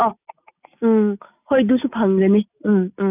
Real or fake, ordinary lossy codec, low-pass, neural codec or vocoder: real; none; 3.6 kHz; none